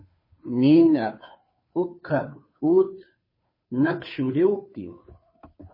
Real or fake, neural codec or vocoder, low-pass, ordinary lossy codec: fake; codec, 16 kHz, 2 kbps, FunCodec, trained on Chinese and English, 25 frames a second; 5.4 kHz; MP3, 24 kbps